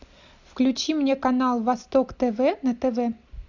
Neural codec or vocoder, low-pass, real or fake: none; 7.2 kHz; real